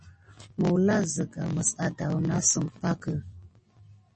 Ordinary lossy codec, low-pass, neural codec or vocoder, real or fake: MP3, 32 kbps; 10.8 kHz; none; real